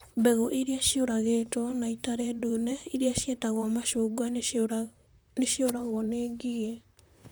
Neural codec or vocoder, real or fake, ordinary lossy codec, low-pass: vocoder, 44.1 kHz, 128 mel bands, Pupu-Vocoder; fake; none; none